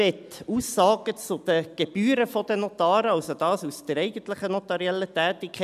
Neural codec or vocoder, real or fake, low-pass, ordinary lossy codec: none; real; 14.4 kHz; none